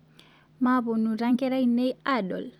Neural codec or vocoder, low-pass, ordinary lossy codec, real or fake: vocoder, 44.1 kHz, 128 mel bands every 256 samples, BigVGAN v2; 19.8 kHz; none; fake